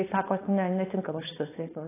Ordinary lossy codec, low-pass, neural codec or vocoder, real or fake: AAC, 16 kbps; 3.6 kHz; codec, 16 kHz, 4.8 kbps, FACodec; fake